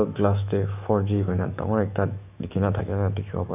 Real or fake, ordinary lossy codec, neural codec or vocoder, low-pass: fake; none; autoencoder, 48 kHz, 128 numbers a frame, DAC-VAE, trained on Japanese speech; 3.6 kHz